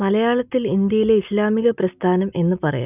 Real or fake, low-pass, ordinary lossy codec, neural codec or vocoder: real; 3.6 kHz; none; none